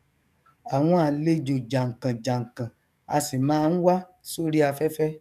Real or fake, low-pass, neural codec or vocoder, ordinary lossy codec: fake; 14.4 kHz; codec, 44.1 kHz, 7.8 kbps, DAC; none